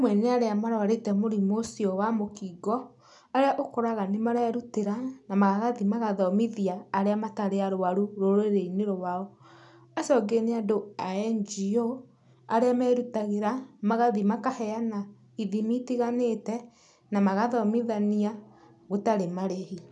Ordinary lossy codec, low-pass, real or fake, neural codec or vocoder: none; 10.8 kHz; real; none